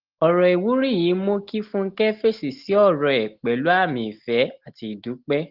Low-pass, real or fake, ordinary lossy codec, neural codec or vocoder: 5.4 kHz; real; Opus, 16 kbps; none